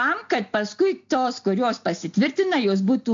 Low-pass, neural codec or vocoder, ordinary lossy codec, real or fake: 7.2 kHz; none; AAC, 64 kbps; real